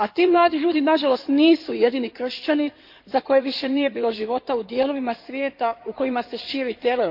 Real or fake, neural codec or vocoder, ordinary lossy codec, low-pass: fake; codec, 16 kHz in and 24 kHz out, 2.2 kbps, FireRedTTS-2 codec; MP3, 48 kbps; 5.4 kHz